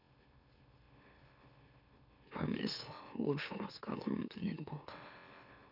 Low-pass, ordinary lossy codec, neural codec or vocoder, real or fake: 5.4 kHz; none; autoencoder, 44.1 kHz, a latent of 192 numbers a frame, MeloTTS; fake